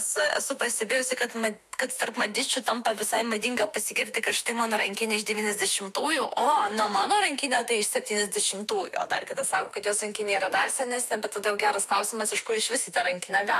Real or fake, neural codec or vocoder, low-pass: fake; autoencoder, 48 kHz, 32 numbers a frame, DAC-VAE, trained on Japanese speech; 14.4 kHz